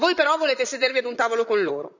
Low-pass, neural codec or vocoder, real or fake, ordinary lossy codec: 7.2 kHz; vocoder, 44.1 kHz, 128 mel bands, Pupu-Vocoder; fake; none